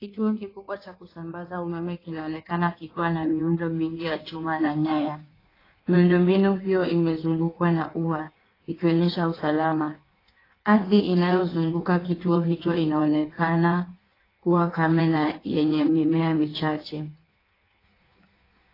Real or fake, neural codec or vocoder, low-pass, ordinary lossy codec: fake; codec, 16 kHz in and 24 kHz out, 1.1 kbps, FireRedTTS-2 codec; 5.4 kHz; AAC, 24 kbps